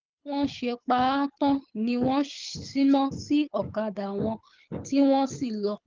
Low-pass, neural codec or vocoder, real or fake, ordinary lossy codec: 7.2 kHz; codec, 24 kHz, 6 kbps, HILCodec; fake; Opus, 24 kbps